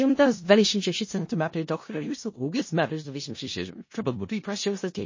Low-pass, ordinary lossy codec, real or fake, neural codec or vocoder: 7.2 kHz; MP3, 32 kbps; fake; codec, 16 kHz in and 24 kHz out, 0.4 kbps, LongCat-Audio-Codec, four codebook decoder